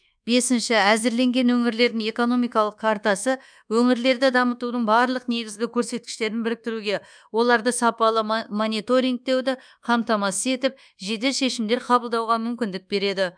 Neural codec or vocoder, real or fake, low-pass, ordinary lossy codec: codec, 24 kHz, 1.2 kbps, DualCodec; fake; 9.9 kHz; none